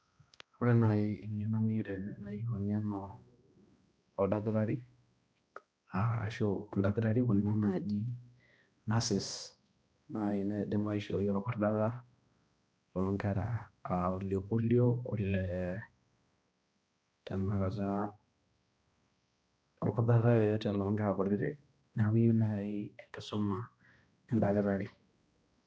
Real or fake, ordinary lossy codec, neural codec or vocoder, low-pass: fake; none; codec, 16 kHz, 1 kbps, X-Codec, HuBERT features, trained on balanced general audio; none